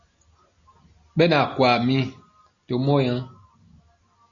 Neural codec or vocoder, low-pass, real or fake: none; 7.2 kHz; real